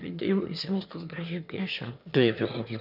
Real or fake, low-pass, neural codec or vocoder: fake; 5.4 kHz; autoencoder, 22.05 kHz, a latent of 192 numbers a frame, VITS, trained on one speaker